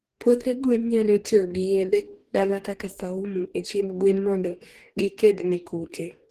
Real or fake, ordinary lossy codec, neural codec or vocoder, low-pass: fake; Opus, 16 kbps; codec, 44.1 kHz, 2.6 kbps, DAC; 14.4 kHz